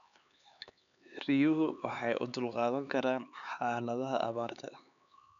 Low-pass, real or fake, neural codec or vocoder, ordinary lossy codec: 7.2 kHz; fake; codec, 16 kHz, 4 kbps, X-Codec, HuBERT features, trained on LibriSpeech; none